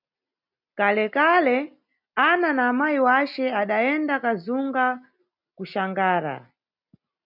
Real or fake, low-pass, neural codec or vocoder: real; 5.4 kHz; none